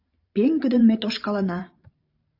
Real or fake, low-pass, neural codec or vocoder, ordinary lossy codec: fake; 5.4 kHz; vocoder, 44.1 kHz, 128 mel bands every 512 samples, BigVGAN v2; AAC, 48 kbps